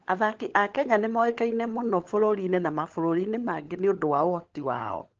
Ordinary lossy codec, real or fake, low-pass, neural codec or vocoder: Opus, 16 kbps; fake; 7.2 kHz; codec, 16 kHz, 16 kbps, FunCodec, trained on LibriTTS, 50 frames a second